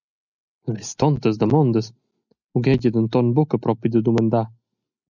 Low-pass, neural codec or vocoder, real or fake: 7.2 kHz; none; real